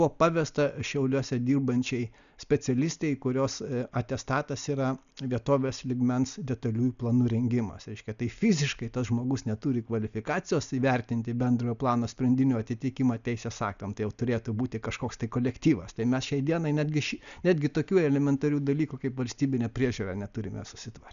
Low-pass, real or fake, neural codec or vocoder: 7.2 kHz; real; none